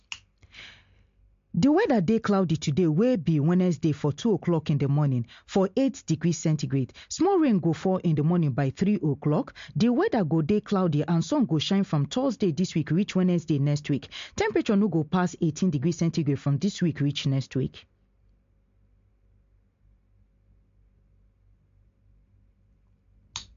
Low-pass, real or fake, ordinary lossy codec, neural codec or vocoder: 7.2 kHz; real; MP3, 48 kbps; none